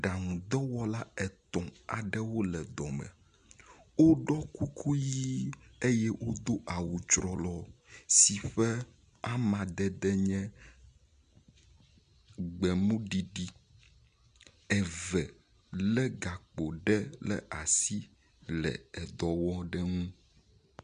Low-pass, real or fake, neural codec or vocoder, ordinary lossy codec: 9.9 kHz; real; none; MP3, 96 kbps